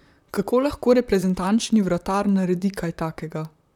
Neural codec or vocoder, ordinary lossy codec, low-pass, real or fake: vocoder, 44.1 kHz, 128 mel bands, Pupu-Vocoder; none; 19.8 kHz; fake